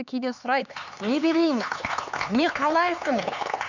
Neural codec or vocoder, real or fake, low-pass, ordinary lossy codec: codec, 16 kHz, 4 kbps, X-Codec, HuBERT features, trained on LibriSpeech; fake; 7.2 kHz; none